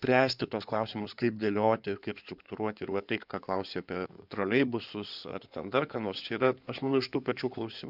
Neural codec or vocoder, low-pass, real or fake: codec, 16 kHz in and 24 kHz out, 2.2 kbps, FireRedTTS-2 codec; 5.4 kHz; fake